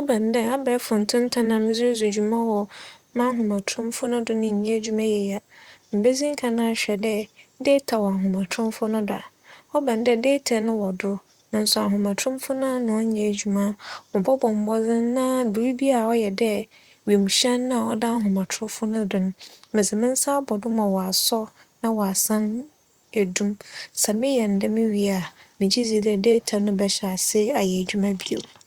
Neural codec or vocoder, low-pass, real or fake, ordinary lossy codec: vocoder, 44.1 kHz, 128 mel bands, Pupu-Vocoder; 19.8 kHz; fake; Opus, 64 kbps